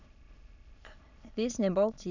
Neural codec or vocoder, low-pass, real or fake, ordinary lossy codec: autoencoder, 22.05 kHz, a latent of 192 numbers a frame, VITS, trained on many speakers; 7.2 kHz; fake; none